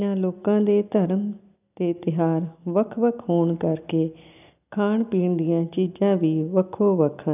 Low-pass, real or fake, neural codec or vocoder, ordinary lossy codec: 3.6 kHz; real; none; none